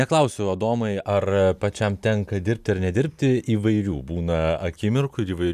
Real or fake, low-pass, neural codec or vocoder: real; 14.4 kHz; none